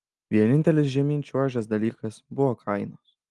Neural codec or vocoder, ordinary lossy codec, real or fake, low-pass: none; Opus, 24 kbps; real; 10.8 kHz